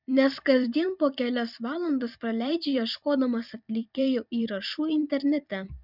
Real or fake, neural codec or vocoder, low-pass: fake; vocoder, 44.1 kHz, 128 mel bands every 256 samples, BigVGAN v2; 5.4 kHz